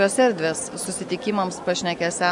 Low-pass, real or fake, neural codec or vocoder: 10.8 kHz; real; none